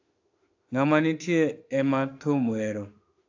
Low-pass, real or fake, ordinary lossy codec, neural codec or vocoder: 7.2 kHz; fake; AAC, 48 kbps; autoencoder, 48 kHz, 32 numbers a frame, DAC-VAE, trained on Japanese speech